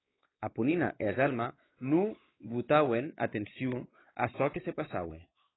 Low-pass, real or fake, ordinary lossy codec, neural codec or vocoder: 7.2 kHz; fake; AAC, 16 kbps; codec, 16 kHz, 4 kbps, X-Codec, WavLM features, trained on Multilingual LibriSpeech